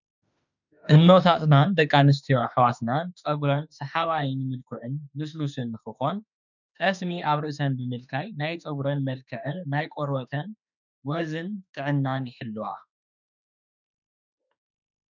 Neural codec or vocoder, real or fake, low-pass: autoencoder, 48 kHz, 32 numbers a frame, DAC-VAE, trained on Japanese speech; fake; 7.2 kHz